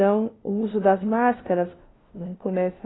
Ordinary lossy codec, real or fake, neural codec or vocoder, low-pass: AAC, 16 kbps; fake; codec, 16 kHz, 0.5 kbps, FunCodec, trained on LibriTTS, 25 frames a second; 7.2 kHz